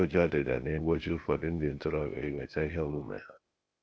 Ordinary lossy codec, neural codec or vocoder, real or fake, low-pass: none; codec, 16 kHz, 0.8 kbps, ZipCodec; fake; none